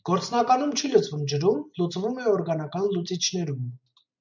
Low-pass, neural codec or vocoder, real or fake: 7.2 kHz; none; real